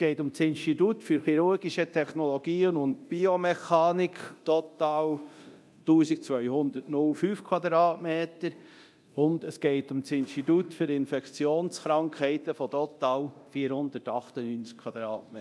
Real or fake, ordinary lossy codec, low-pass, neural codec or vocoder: fake; none; none; codec, 24 kHz, 0.9 kbps, DualCodec